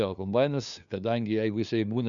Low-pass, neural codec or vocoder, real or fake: 7.2 kHz; codec, 16 kHz, 2 kbps, FunCodec, trained on LibriTTS, 25 frames a second; fake